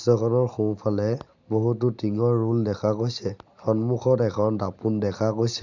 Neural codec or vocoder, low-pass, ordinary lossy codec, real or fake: none; 7.2 kHz; none; real